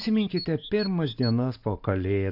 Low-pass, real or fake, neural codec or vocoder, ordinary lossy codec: 5.4 kHz; real; none; AAC, 48 kbps